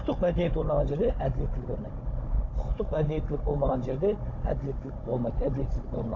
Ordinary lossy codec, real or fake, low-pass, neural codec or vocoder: AAC, 32 kbps; fake; 7.2 kHz; codec, 16 kHz, 16 kbps, FunCodec, trained on Chinese and English, 50 frames a second